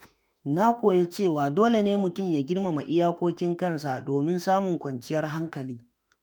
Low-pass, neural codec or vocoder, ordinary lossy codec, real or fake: none; autoencoder, 48 kHz, 32 numbers a frame, DAC-VAE, trained on Japanese speech; none; fake